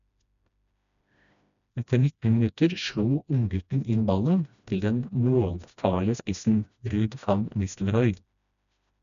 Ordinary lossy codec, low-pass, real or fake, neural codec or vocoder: none; 7.2 kHz; fake; codec, 16 kHz, 1 kbps, FreqCodec, smaller model